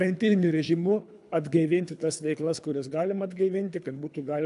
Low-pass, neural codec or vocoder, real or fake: 10.8 kHz; codec, 24 kHz, 3 kbps, HILCodec; fake